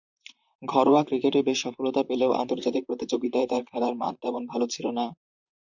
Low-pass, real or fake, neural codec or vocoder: 7.2 kHz; fake; vocoder, 44.1 kHz, 128 mel bands, Pupu-Vocoder